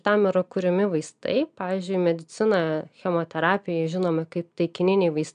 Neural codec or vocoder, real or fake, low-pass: none; real; 9.9 kHz